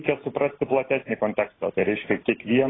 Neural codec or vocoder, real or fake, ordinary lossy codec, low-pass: none; real; AAC, 16 kbps; 7.2 kHz